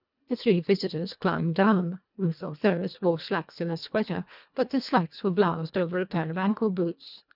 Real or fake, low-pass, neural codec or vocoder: fake; 5.4 kHz; codec, 24 kHz, 1.5 kbps, HILCodec